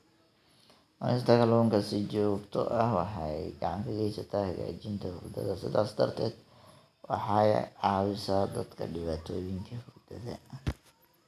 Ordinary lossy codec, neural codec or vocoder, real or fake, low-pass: none; none; real; 14.4 kHz